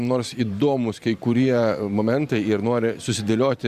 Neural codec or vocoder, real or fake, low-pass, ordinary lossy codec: vocoder, 44.1 kHz, 128 mel bands every 512 samples, BigVGAN v2; fake; 14.4 kHz; Opus, 64 kbps